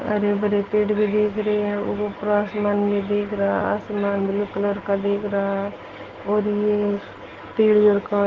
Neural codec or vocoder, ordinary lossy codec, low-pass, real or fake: none; Opus, 16 kbps; 7.2 kHz; real